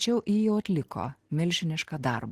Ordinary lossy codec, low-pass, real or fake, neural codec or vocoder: Opus, 16 kbps; 14.4 kHz; real; none